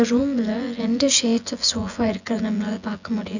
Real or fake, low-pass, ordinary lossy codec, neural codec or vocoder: fake; 7.2 kHz; none; vocoder, 24 kHz, 100 mel bands, Vocos